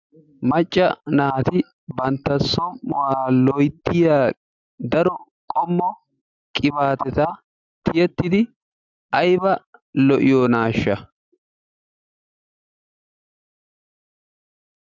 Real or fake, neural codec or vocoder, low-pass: real; none; 7.2 kHz